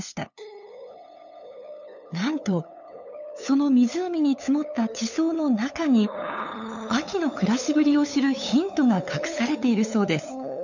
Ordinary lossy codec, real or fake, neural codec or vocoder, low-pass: none; fake; codec, 16 kHz, 8 kbps, FunCodec, trained on LibriTTS, 25 frames a second; 7.2 kHz